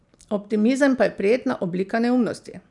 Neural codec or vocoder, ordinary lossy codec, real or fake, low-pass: none; none; real; 10.8 kHz